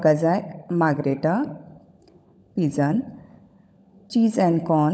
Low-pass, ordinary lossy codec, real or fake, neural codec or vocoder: none; none; fake; codec, 16 kHz, 16 kbps, FunCodec, trained on LibriTTS, 50 frames a second